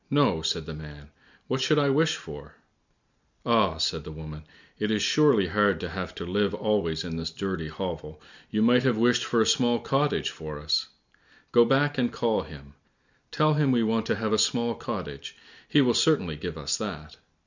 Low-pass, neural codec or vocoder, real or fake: 7.2 kHz; none; real